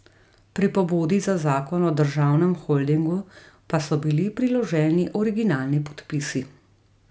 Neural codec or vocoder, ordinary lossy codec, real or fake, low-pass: none; none; real; none